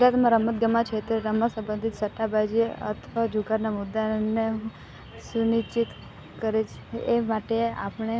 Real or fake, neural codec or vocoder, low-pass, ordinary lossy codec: real; none; none; none